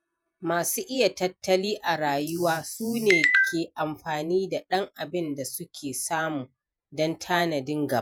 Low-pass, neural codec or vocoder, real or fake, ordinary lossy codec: none; vocoder, 48 kHz, 128 mel bands, Vocos; fake; none